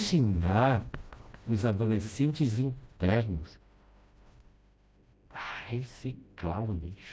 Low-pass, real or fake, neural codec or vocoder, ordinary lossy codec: none; fake; codec, 16 kHz, 1 kbps, FreqCodec, smaller model; none